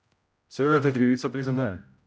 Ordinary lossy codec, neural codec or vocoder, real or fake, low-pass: none; codec, 16 kHz, 0.5 kbps, X-Codec, HuBERT features, trained on general audio; fake; none